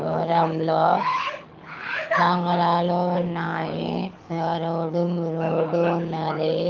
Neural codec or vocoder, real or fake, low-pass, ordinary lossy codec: codec, 24 kHz, 6 kbps, HILCodec; fake; 7.2 kHz; Opus, 24 kbps